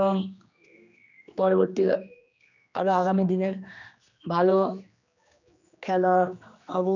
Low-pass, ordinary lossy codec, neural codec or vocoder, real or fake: 7.2 kHz; none; codec, 16 kHz, 2 kbps, X-Codec, HuBERT features, trained on general audio; fake